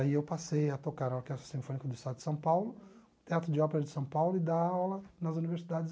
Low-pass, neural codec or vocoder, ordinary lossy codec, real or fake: none; none; none; real